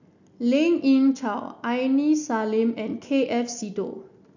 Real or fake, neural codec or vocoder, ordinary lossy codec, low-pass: real; none; none; 7.2 kHz